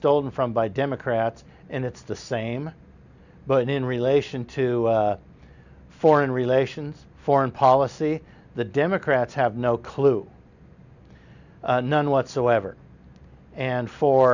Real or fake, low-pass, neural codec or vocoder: real; 7.2 kHz; none